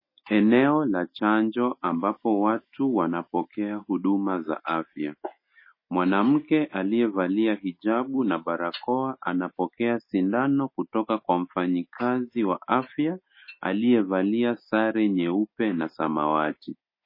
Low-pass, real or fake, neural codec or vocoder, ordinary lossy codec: 5.4 kHz; real; none; MP3, 24 kbps